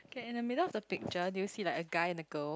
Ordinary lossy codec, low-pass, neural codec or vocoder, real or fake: none; none; none; real